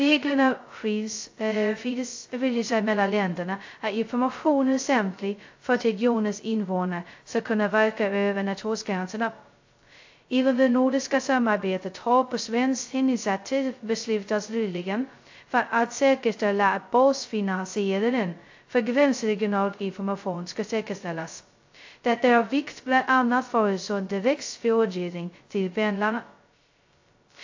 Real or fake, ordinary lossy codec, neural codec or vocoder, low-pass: fake; AAC, 48 kbps; codec, 16 kHz, 0.2 kbps, FocalCodec; 7.2 kHz